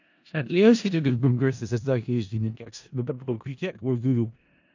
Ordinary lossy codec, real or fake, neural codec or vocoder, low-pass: AAC, 48 kbps; fake; codec, 16 kHz in and 24 kHz out, 0.4 kbps, LongCat-Audio-Codec, four codebook decoder; 7.2 kHz